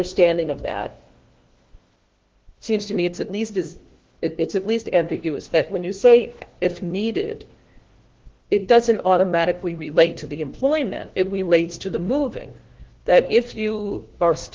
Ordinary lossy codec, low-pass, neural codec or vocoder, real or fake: Opus, 16 kbps; 7.2 kHz; codec, 16 kHz, 1 kbps, FunCodec, trained on LibriTTS, 50 frames a second; fake